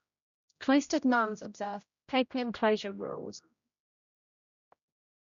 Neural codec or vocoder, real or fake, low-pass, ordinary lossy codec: codec, 16 kHz, 0.5 kbps, X-Codec, HuBERT features, trained on general audio; fake; 7.2 kHz; AAC, 96 kbps